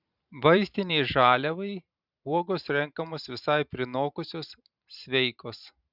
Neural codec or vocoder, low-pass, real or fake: vocoder, 44.1 kHz, 128 mel bands every 512 samples, BigVGAN v2; 5.4 kHz; fake